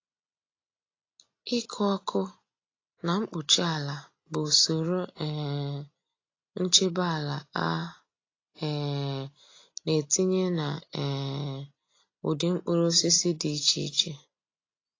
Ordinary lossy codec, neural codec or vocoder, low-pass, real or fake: AAC, 32 kbps; none; 7.2 kHz; real